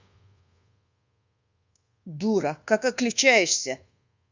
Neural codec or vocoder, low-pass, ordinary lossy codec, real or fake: codec, 24 kHz, 1.2 kbps, DualCodec; 7.2 kHz; Opus, 64 kbps; fake